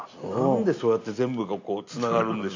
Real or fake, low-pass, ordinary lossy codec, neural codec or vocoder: real; 7.2 kHz; AAC, 48 kbps; none